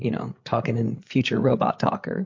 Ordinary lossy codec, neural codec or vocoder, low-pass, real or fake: MP3, 48 kbps; codec, 16 kHz, 8 kbps, FreqCodec, larger model; 7.2 kHz; fake